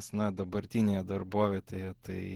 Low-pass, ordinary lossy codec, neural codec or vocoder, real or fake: 19.8 kHz; Opus, 16 kbps; vocoder, 48 kHz, 128 mel bands, Vocos; fake